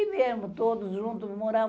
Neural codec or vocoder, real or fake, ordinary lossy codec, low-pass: none; real; none; none